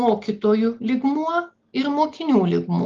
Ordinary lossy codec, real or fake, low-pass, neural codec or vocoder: Opus, 32 kbps; real; 7.2 kHz; none